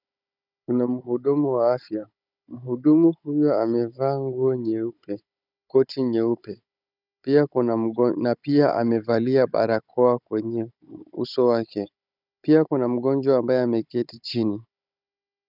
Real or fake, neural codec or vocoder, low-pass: fake; codec, 16 kHz, 16 kbps, FunCodec, trained on Chinese and English, 50 frames a second; 5.4 kHz